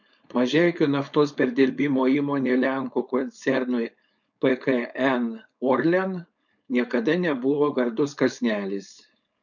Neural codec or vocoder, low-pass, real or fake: codec, 16 kHz, 4.8 kbps, FACodec; 7.2 kHz; fake